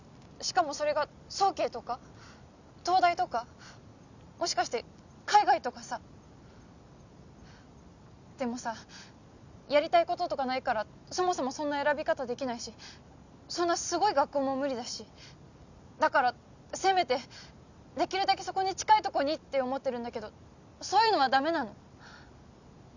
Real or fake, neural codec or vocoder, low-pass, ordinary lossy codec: real; none; 7.2 kHz; none